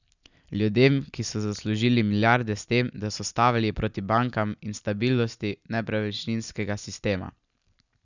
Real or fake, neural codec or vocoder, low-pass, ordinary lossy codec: real; none; 7.2 kHz; none